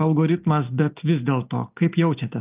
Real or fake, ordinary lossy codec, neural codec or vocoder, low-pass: real; Opus, 24 kbps; none; 3.6 kHz